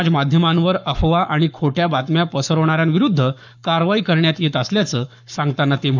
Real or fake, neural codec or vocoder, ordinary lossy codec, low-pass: fake; codec, 44.1 kHz, 7.8 kbps, Pupu-Codec; none; 7.2 kHz